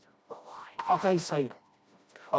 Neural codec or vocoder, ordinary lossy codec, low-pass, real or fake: codec, 16 kHz, 1 kbps, FreqCodec, smaller model; none; none; fake